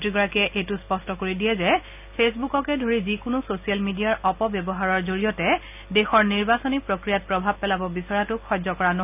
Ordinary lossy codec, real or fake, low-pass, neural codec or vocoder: none; real; 3.6 kHz; none